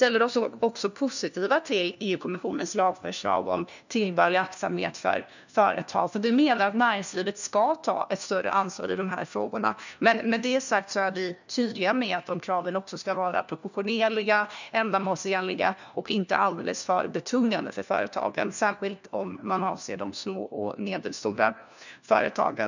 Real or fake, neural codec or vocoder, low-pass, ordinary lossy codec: fake; codec, 16 kHz, 1 kbps, FunCodec, trained on LibriTTS, 50 frames a second; 7.2 kHz; none